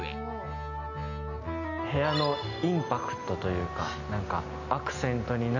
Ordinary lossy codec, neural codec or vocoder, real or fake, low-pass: none; none; real; 7.2 kHz